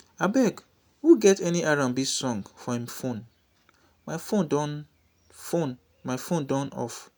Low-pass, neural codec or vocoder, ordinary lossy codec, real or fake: none; none; none; real